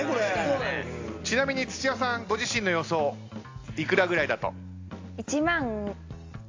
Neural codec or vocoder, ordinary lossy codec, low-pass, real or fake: none; AAC, 48 kbps; 7.2 kHz; real